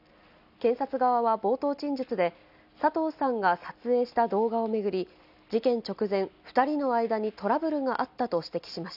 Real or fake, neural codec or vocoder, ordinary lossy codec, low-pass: real; none; none; 5.4 kHz